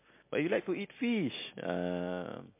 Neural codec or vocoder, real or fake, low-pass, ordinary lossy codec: none; real; 3.6 kHz; MP3, 24 kbps